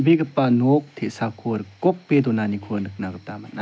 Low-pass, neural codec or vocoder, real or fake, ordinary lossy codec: none; none; real; none